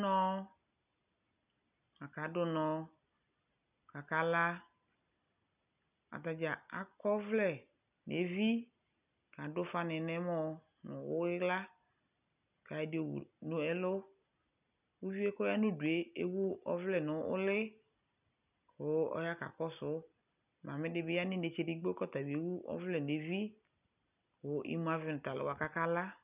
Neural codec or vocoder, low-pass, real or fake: none; 3.6 kHz; real